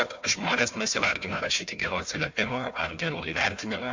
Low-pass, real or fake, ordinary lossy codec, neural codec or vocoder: 7.2 kHz; fake; MP3, 48 kbps; codec, 16 kHz, 1 kbps, FunCodec, trained on Chinese and English, 50 frames a second